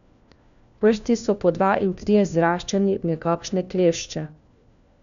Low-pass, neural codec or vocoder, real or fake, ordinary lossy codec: 7.2 kHz; codec, 16 kHz, 1 kbps, FunCodec, trained on LibriTTS, 50 frames a second; fake; none